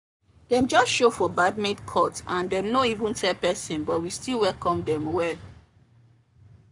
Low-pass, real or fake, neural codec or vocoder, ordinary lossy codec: 10.8 kHz; fake; codec, 44.1 kHz, 7.8 kbps, Pupu-Codec; none